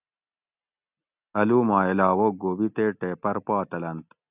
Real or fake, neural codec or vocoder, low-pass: real; none; 3.6 kHz